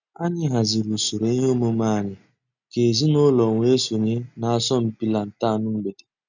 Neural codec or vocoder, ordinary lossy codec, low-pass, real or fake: none; none; 7.2 kHz; real